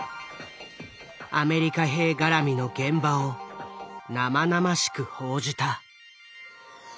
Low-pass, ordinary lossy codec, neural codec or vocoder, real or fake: none; none; none; real